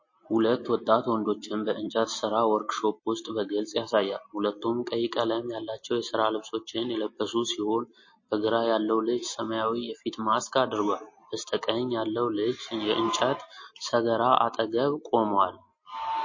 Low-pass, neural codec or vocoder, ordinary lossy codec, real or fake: 7.2 kHz; none; MP3, 32 kbps; real